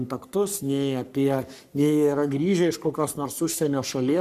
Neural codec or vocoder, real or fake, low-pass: codec, 44.1 kHz, 3.4 kbps, Pupu-Codec; fake; 14.4 kHz